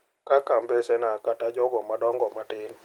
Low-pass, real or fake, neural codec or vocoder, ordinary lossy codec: 19.8 kHz; real; none; Opus, 24 kbps